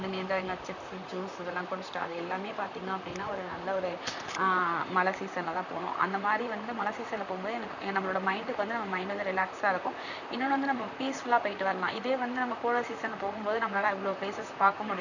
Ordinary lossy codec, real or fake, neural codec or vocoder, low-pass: none; fake; vocoder, 44.1 kHz, 128 mel bands, Pupu-Vocoder; 7.2 kHz